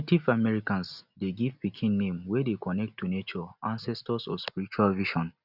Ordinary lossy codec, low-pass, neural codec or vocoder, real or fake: none; 5.4 kHz; none; real